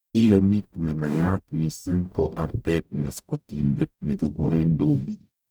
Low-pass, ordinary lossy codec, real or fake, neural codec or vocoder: none; none; fake; codec, 44.1 kHz, 0.9 kbps, DAC